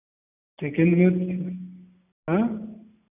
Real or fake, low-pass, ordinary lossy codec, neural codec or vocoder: real; 3.6 kHz; none; none